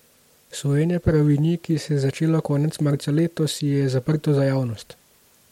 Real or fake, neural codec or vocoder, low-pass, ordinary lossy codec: real; none; 19.8 kHz; MP3, 64 kbps